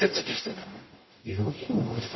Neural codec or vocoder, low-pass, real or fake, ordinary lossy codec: codec, 44.1 kHz, 0.9 kbps, DAC; 7.2 kHz; fake; MP3, 24 kbps